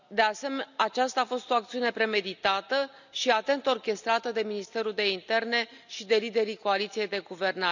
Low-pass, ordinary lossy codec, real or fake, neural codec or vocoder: 7.2 kHz; none; real; none